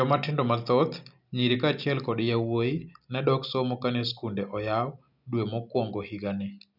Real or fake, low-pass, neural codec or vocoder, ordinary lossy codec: real; 5.4 kHz; none; none